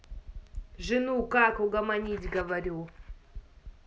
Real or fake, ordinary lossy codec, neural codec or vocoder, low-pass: real; none; none; none